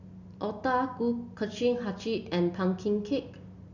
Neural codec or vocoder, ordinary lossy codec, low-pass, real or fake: none; none; 7.2 kHz; real